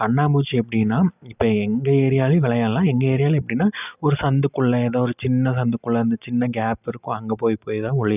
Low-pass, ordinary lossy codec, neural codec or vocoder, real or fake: 3.6 kHz; none; none; real